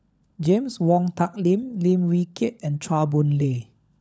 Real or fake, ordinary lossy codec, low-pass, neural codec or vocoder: fake; none; none; codec, 16 kHz, 16 kbps, FunCodec, trained on LibriTTS, 50 frames a second